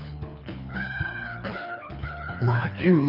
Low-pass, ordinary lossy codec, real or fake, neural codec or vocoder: 5.4 kHz; none; fake; codec, 24 kHz, 6 kbps, HILCodec